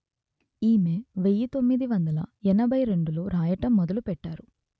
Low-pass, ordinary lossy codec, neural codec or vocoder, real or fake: none; none; none; real